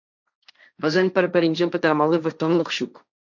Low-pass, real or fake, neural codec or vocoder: 7.2 kHz; fake; codec, 16 kHz, 1.1 kbps, Voila-Tokenizer